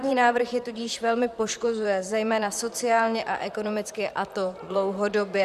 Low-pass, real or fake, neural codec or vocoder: 14.4 kHz; fake; vocoder, 44.1 kHz, 128 mel bands, Pupu-Vocoder